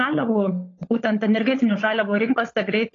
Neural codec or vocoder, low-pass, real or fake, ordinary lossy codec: codec, 16 kHz, 16 kbps, FunCodec, trained on LibriTTS, 50 frames a second; 7.2 kHz; fake; AAC, 32 kbps